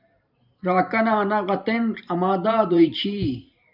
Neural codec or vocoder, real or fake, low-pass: vocoder, 44.1 kHz, 128 mel bands every 512 samples, BigVGAN v2; fake; 5.4 kHz